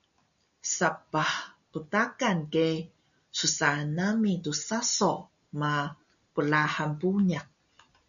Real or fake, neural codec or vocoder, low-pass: real; none; 7.2 kHz